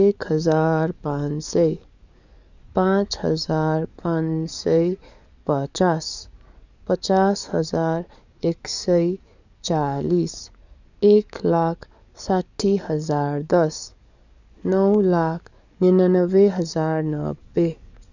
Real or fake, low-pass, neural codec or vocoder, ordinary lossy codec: fake; 7.2 kHz; codec, 44.1 kHz, 7.8 kbps, DAC; none